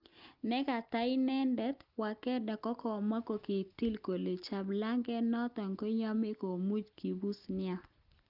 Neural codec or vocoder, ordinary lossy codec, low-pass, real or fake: none; Opus, 24 kbps; 5.4 kHz; real